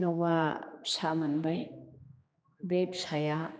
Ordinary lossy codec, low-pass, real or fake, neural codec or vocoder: none; none; fake; codec, 16 kHz, 2 kbps, X-Codec, HuBERT features, trained on general audio